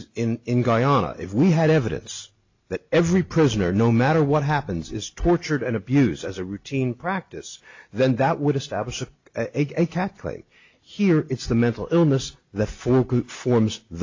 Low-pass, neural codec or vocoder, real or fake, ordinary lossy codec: 7.2 kHz; none; real; AAC, 48 kbps